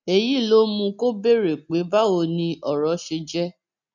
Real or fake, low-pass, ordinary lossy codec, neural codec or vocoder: real; 7.2 kHz; none; none